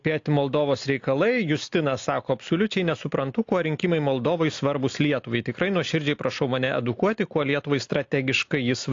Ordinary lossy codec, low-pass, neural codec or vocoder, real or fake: AAC, 48 kbps; 7.2 kHz; none; real